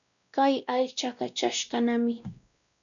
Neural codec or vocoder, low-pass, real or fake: codec, 16 kHz, 1 kbps, X-Codec, WavLM features, trained on Multilingual LibriSpeech; 7.2 kHz; fake